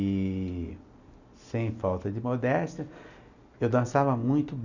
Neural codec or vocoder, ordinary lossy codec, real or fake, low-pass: none; none; real; 7.2 kHz